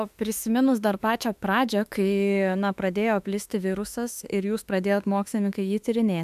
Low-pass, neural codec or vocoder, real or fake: 14.4 kHz; autoencoder, 48 kHz, 32 numbers a frame, DAC-VAE, trained on Japanese speech; fake